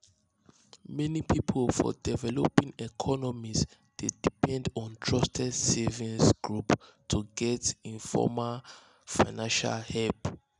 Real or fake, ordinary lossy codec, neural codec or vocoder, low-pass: real; none; none; 10.8 kHz